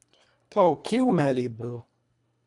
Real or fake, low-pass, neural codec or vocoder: fake; 10.8 kHz; codec, 24 kHz, 1.5 kbps, HILCodec